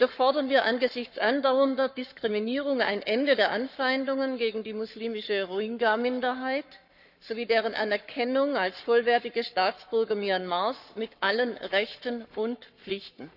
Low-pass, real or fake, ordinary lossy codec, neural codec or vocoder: 5.4 kHz; fake; none; codec, 44.1 kHz, 7.8 kbps, Pupu-Codec